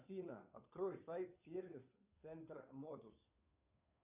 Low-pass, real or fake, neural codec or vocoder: 3.6 kHz; fake; codec, 16 kHz, 2 kbps, FunCodec, trained on Chinese and English, 25 frames a second